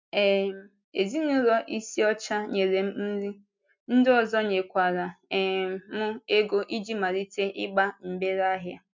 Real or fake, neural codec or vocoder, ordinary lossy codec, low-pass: real; none; MP3, 64 kbps; 7.2 kHz